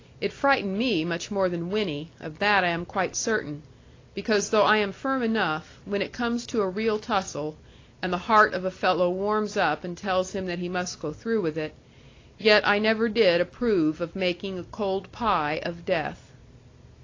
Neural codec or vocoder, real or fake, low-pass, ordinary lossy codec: none; real; 7.2 kHz; AAC, 32 kbps